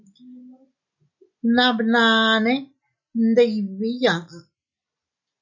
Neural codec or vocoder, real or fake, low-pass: none; real; 7.2 kHz